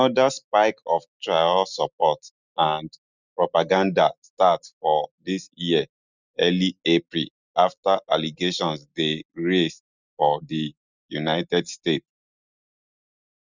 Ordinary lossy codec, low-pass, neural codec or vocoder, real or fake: none; 7.2 kHz; none; real